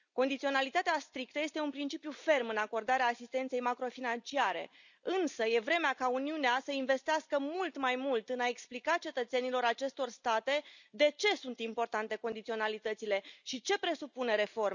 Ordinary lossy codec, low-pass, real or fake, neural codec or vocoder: none; 7.2 kHz; real; none